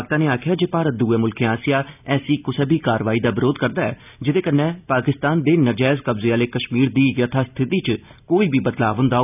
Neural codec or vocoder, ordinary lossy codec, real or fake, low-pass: none; none; real; 3.6 kHz